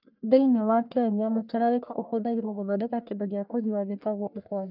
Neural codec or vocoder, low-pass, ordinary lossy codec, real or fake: codec, 44.1 kHz, 1.7 kbps, Pupu-Codec; 5.4 kHz; none; fake